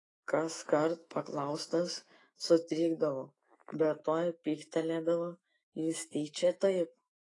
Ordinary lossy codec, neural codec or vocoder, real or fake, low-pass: AAC, 32 kbps; autoencoder, 48 kHz, 128 numbers a frame, DAC-VAE, trained on Japanese speech; fake; 10.8 kHz